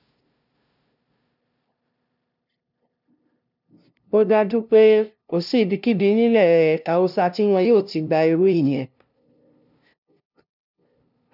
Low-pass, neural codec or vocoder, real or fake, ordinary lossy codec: 5.4 kHz; codec, 16 kHz, 0.5 kbps, FunCodec, trained on LibriTTS, 25 frames a second; fake; none